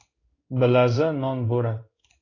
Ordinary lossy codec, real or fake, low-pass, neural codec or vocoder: AAC, 32 kbps; fake; 7.2 kHz; codec, 16 kHz in and 24 kHz out, 1 kbps, XY-Tokenizer